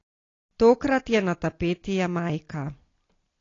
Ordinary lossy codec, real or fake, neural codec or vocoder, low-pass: AAC, 32 kbps; real; none; 7.2 kHz